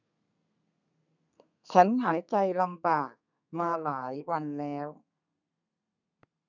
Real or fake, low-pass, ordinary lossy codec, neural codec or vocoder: fake; 7.2 kHz; none; codec, 32 kHz, 1.9 kbps, SNAC